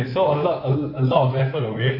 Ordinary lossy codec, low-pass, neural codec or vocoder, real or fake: none; 5.4 kHz; codec, 16 kHz in and 24 kHz out, 2.2 kbps, FireRedTTS-2 codec; fake